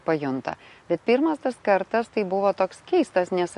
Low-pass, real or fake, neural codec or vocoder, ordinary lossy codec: 14.4 kHz; real; none; MP3, 48 kbps